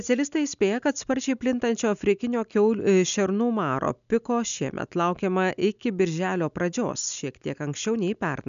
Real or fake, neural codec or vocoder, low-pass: real; none; 7.2 kHz